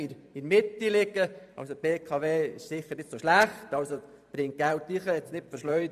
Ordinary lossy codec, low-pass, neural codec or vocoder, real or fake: none; 14.4 kHz; vocoder, 44.1 kHz, 128 mel bands every 512 samples, BigVGAN v2; fake